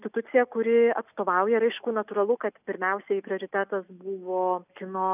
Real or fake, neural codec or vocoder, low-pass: real; none; 3.6 kHz